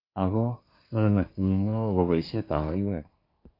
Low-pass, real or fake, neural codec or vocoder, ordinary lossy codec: 5.4 kHz; fake; codec, 24 kHz, 1 kbps, SNAC; MP3, 32 kbps